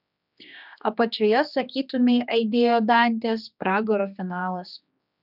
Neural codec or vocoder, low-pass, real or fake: codec, 16 kHz, 2 kbps, X-Codec, HuBERT features, trained on general audio; 5.4 kHz; fake